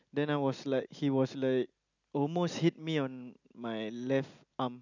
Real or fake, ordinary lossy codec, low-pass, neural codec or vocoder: real; none; 7.2 kHz; none